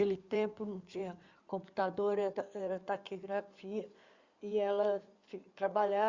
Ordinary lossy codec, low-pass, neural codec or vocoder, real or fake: Opus, 64 kbps; 7.2 kHz; codec, 16 kHz in and 24 kHz out, 2.2 kbps, FireRedTTS-2 codec; fake